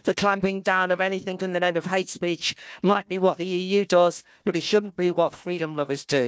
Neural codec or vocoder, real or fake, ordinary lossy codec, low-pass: codec, 16 kHz, 1 kbps, FunCodec, trained on Chinese and English, 50 frames a second; fake; none; none